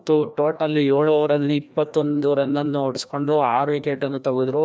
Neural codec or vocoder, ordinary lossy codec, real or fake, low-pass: codec, 16 kHz, 1 kbps, FreqCodec, larger model; none; fake; none